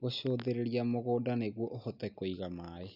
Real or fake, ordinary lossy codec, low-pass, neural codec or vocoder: real; none; 5.4 kHz; none